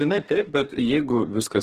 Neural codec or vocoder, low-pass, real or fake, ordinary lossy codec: codec, 32 kHz, 1.9 kbps, SNAC; 14.4 kHz; fake; Opus, 16 kbps